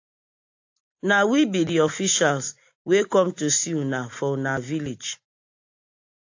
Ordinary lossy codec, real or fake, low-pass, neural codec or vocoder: AAC, 48 kbps; real; 7.2 kHz; none